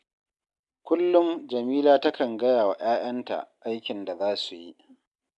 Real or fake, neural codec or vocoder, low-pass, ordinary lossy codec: real; none; 10.8 kHz; MP3, 96 kbps